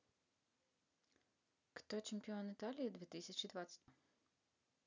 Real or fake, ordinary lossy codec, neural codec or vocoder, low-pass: real; none; none; 7.2 kHz